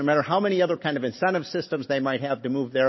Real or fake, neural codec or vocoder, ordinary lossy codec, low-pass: real; none; MP3, 24 kbps; 7.2 kHz